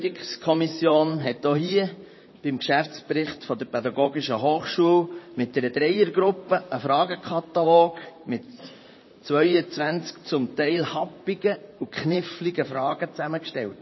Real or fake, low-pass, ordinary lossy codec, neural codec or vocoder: fake; 7.2 kHz; MP3, 24 kbps; vocoder, 44.1 kHz, 128 mel bands, Pupu-Vocoder